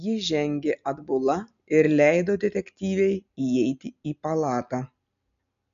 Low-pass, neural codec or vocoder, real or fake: 7.2 kHz; none; real